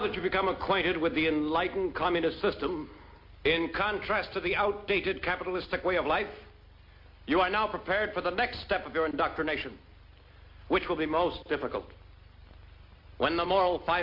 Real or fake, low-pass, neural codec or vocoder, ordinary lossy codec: real; 5.4 kHz; none; MP3, 32 kbps